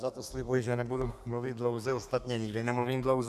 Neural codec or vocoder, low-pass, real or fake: codec, 32 kHz, 1.9 kbps, SNAC; 14.4 kHz; fake